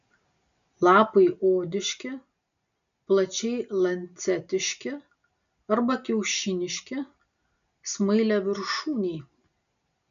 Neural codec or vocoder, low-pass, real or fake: none; 7.2 kHz; real